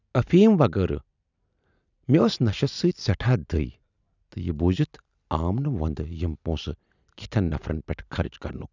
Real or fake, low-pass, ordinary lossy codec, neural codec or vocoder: real; 7.2 kHz; none; none